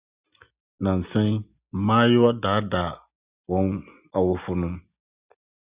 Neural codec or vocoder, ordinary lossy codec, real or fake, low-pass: none; Opus, 64 kbps; real; 3.6 kHz